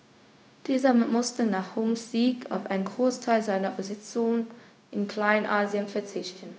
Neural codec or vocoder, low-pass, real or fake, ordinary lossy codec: codec, 16 kHz, 0.4 kbps, LongCat-Audio-Codec; none; fake; none